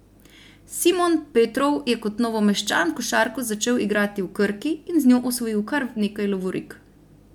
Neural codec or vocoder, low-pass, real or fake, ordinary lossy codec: none; 19.8 kHz; real; MP3, 96 kbps